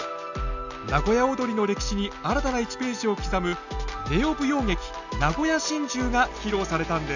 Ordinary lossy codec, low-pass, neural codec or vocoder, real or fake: none; 7.2 kHz; none; real